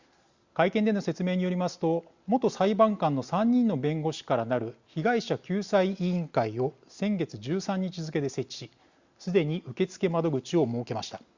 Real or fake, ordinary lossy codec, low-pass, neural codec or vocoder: real; Opus, 64 kbps; 7.2 kHz; none